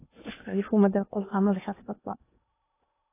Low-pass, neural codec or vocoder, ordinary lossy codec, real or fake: 3.6 kHz; codec, 16 kHz in and 24 kHz out, 0.8 kbps, FocalCodec, streaming, 65536 codes; AAC, 24 kbps; fake